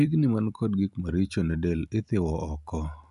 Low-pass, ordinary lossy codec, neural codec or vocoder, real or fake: 10.8 kHz; none; none; real